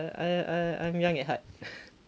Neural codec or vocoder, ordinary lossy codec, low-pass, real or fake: none; none; none; real